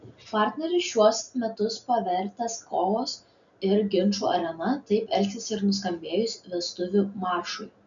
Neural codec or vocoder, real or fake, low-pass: none; real; 7.2 kHz